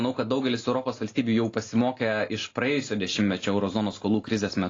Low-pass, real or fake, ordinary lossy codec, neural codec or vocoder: 7.2 kHz; real; AAC, 32 kbps; none